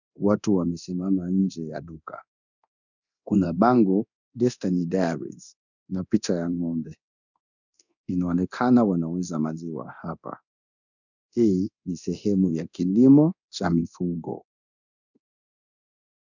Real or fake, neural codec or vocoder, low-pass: fake; codec, 24 kHz, 0.9 kbps, DualCodec; 7.2 kHz